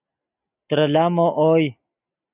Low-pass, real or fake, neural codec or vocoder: 3.6 kHz; real; none